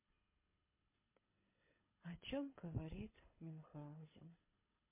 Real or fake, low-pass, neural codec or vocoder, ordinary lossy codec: fake; 3.6 kHz; codec, 24 kHz, 6 kbps, HILCodec; MP3, 24 kbps